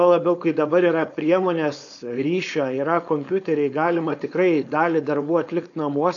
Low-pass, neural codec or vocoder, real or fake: 7.2 kHz; codec, 16 kHz, 4.8 kbps, FACodec; fake